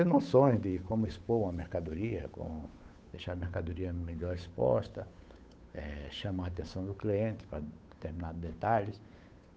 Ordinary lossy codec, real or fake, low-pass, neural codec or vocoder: none; fake; none; codec, 16 kHz, 8 kbps, FunCodec, trained on Chinese and English, 25 frames a second